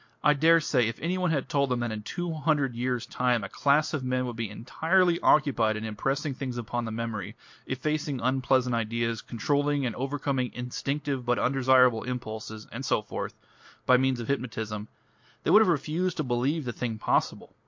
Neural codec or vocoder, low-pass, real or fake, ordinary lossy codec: none; 7.2 kHz; real; MP3, 48 kbps